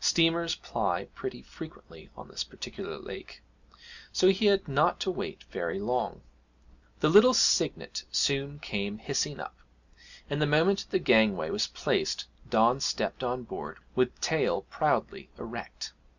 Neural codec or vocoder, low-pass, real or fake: none; 7.2 kHz; real